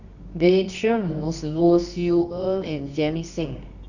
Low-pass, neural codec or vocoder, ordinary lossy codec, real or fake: 7.2 kHz; codec, 24 kHz, 0.9 kbps, WavTokenizer, medium music audio release; none; fake